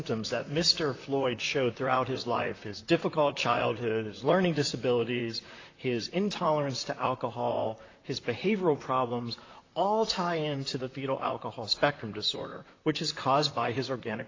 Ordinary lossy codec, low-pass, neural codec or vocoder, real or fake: AAC, 32 kbps; 7.2 kHz; vocoder, 44.1 kHz, 128 mel bands, Pupu-Vocoder; fake